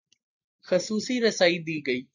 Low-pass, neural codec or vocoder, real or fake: 7.2 kHz; none; real